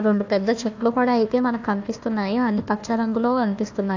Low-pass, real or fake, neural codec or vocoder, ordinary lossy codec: 7.2 kHz; fake; codec, 16 kHz, 1 kbps, FunCodec, trained on Chinese and English, 50 frames a second; AAC, 48 kbps